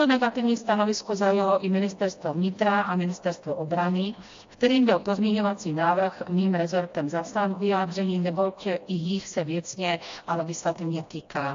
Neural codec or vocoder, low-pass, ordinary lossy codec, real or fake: codec, 16 kHz, 1 kbps, FreqCodec, smaller model; 7.2 kHz; AAC, 48 kbps; fake